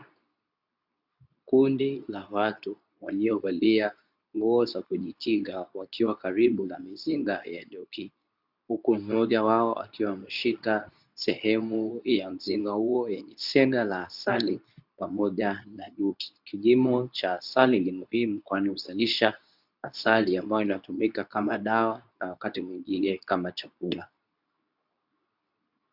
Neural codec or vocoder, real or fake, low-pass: codec, 24 kHz, 0.9 kbps, WavTokenizer, medium speech release version 2; fake; 5.4 kHz